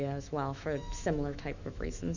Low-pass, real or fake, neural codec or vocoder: 7.2 kHz; fake; autoencoder, 48 kHz, 128 numbers a frame, DAC-VAE, trained on Japanese speech